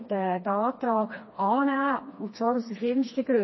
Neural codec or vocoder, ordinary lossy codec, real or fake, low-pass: codec, 16 kHz, 2 kbps, FreqCodec, smaller model; MP3, 24 kbps; fake; 7.2 kHz